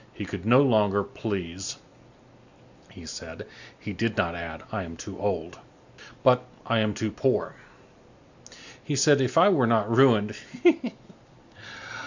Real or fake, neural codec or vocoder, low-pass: real; none; 7.2 kHz